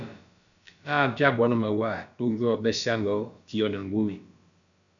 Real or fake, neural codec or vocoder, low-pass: fake; codec, 16 kHz, about 1 kbps, DyCAST, with the encoder's durations; 7.2 kHz